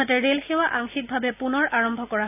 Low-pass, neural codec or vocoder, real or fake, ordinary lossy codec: 3.6 kHz; none; real; none